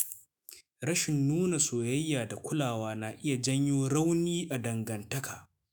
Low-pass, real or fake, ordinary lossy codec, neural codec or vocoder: none; fake; none; autoencoder, 48 kHz, 128 numbers a frame, DAC-VAE, trained on Japanese speech